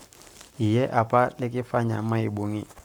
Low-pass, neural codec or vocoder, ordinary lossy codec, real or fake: none; codec, 44.1 kHz, 7.8 kbps, Pupu-Codec; none; fake